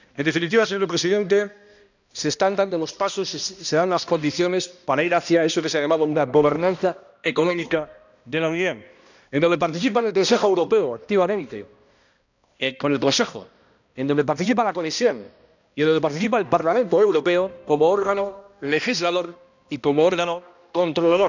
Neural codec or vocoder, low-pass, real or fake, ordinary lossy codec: codec, 16 kHz, 1 kbps, X-Codec, HuBERT features, trained on balanced general audio; 7.2 kHz; fake; none